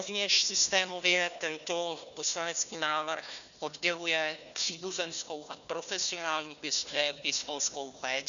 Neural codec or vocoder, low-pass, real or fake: codec, 16 kHz, 1 kbps, FunCodec, trained on Chinese and English, 50 frames a second; 7.2 kHz; fake